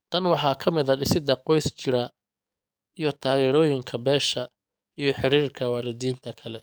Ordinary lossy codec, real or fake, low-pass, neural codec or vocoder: none; fake; none; codec, 44.1 kHz, 7.8 kbps, DAC